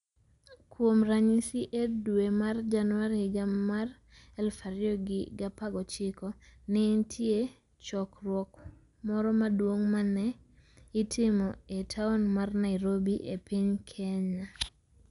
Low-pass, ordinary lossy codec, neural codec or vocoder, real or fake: 10.8 kHz; none; none; real